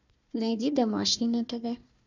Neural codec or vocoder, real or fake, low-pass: codec, 16 kHz, 1 kbps, FunCodec, trained on Chinese and English, 50 frames a second; fake; 7.2 kHz